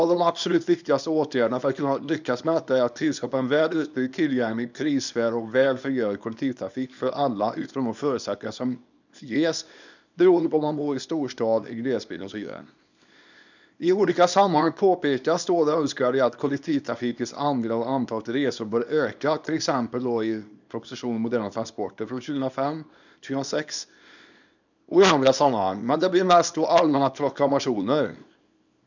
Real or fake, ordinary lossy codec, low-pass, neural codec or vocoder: fake; none; 7.2 kHz; codec, 24 kHz, 0.9 kbps, WavTokenizer, small release